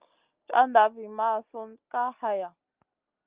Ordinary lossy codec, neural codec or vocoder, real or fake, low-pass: Opus, 24 kbps; none; real; 3.6 kHz